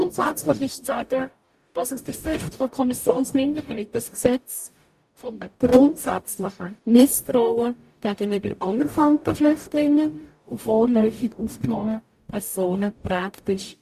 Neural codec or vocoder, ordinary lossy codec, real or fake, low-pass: codec, 44.1 kHz, 0.9 kbps, DAC; Opus, 64 kbps; fake; 14.4 kHz